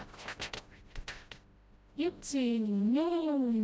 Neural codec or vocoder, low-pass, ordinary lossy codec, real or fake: codec, 16 kHz, 0.5 kbps, FreqCodec, smaller model; none; none; fake